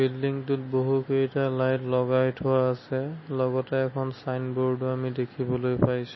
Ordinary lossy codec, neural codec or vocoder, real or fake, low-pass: MP3, 24 kbps; none; real; 7.2 kHz